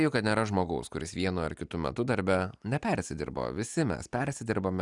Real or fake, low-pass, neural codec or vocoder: real; 10.8 kHz; none